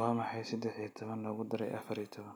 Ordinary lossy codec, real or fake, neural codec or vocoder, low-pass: none; real; none; none